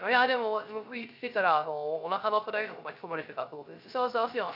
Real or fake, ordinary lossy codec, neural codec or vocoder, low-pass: fake; none; codec, 16 kHz, 0.3 kbps, FocalCodec; 5.4 kHz